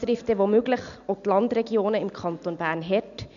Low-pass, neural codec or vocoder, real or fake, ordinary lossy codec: 7.2 kHz; none; real; MP3, 96 kbps